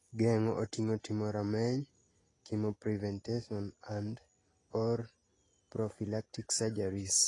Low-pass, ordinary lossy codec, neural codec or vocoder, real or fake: 10.8 kHz; AAC, 32 kbps; none; real